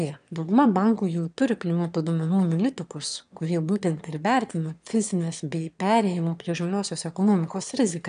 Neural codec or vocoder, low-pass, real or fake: autoencoder, 22.05 kHz, a latent of 192 numbers a frame, VITS, trained on one speaker; 9.9 kHz; fake